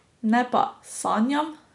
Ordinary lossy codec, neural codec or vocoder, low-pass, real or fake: none; none; 10.8 kHz; real